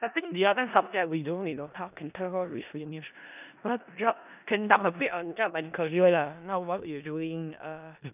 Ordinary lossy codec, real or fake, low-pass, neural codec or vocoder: none; fake; 3.6 kHz; codec, 16 kHz in and 24 kHz out, 0.4 kbps, LongCat-Audio-Codec, four codebook decoder